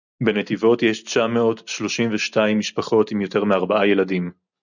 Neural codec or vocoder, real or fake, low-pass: none; real; 7.2 kHz